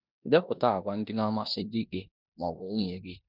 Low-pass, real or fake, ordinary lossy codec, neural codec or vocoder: 5.4 kHz; fake; none; codec, 16 kHz in and 24 kHz out, 0.9 kbps, LongCat-Audio-Codec, four codebook decoder